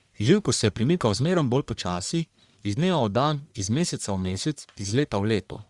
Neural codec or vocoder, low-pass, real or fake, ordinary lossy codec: codec, 44.1 kHz, 1.7 kbps, Pupu-Codec; 10.8 kHz; fake; Opus, 64 kbps